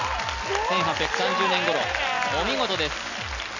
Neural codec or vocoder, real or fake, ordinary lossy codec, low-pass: none; real; none; 7.2 kHz